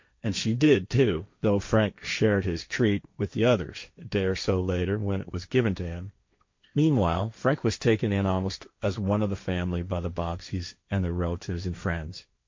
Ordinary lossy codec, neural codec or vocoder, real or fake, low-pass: MP3, 48 kbps; codec, 16 kHz, 1.1 kbps, Voila-Tokenizer; fake; 7.2 kHz